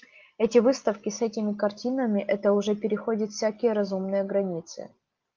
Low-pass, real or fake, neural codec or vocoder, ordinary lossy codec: 7.2 kHz; real; none; Opus, 24 kbps